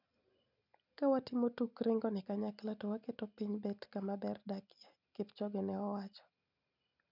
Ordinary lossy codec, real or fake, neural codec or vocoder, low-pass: none; real; none; 5.4 kHz